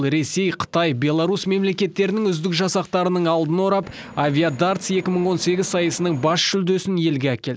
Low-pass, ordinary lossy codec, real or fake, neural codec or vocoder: none; none; real; none